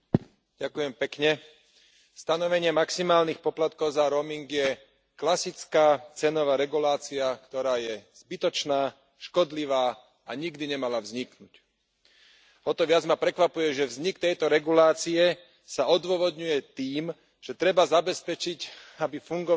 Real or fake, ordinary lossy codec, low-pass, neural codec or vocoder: real; none; none; none